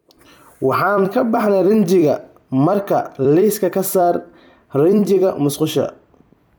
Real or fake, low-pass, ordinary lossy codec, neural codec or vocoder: fake; none; none; vocoder, 44.1 kHz, 128 mel bands every 256 samples, BigVGAN v2